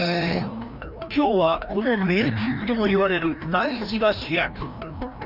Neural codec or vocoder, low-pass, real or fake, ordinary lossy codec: codec, 16 kHz, 1 kbps, FreqCodec, larger model; 5.4 kHz; fake; none